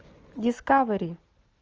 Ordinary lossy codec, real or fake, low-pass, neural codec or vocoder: Opus, 24 kbps; real; 7.2 kHz; none